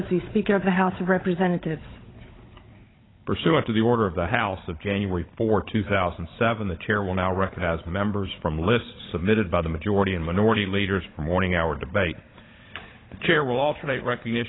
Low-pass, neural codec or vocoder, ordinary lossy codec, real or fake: 7.2 kHz; codec, 16 kHz, 16 kbps, FunCodec, trained on Chinese and English, 50 frames a second; AAC, 16 kbps; fake